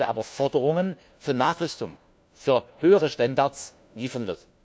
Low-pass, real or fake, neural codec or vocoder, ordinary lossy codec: none; fake; codec, 16 kHz, 1 kbps, FunCodec, trained on LibriTTS, 50 frames a second; none